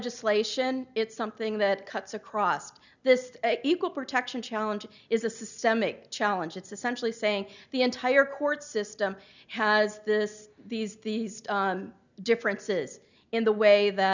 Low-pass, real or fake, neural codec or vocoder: 7.2 kHz; real; none